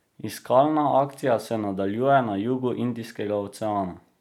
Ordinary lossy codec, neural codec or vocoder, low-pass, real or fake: none; none; 19.8 kHz; real